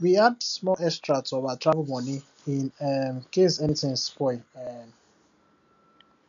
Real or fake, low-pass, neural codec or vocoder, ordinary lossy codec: real; 7.2 kHz; none; none